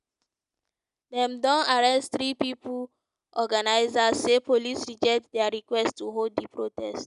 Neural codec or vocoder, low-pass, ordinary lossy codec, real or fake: none; 10.8 kHz; none; real